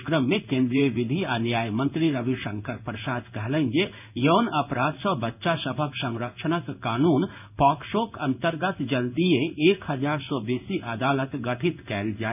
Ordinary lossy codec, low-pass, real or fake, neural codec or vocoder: none; 3.6 kHz; fake; codec, 16 kHz in and 24 kHz out, 1 kbps, XY-Tokenizer